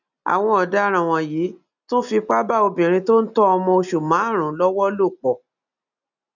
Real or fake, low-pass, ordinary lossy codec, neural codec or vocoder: real; 7.2 kHz; none; none